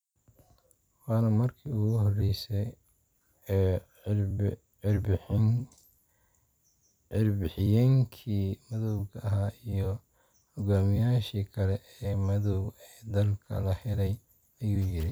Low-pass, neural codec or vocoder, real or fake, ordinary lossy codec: none; vocoder, 44.1 kHz, 128 mel bands every 256 samples, BigVGAN v2; fake; none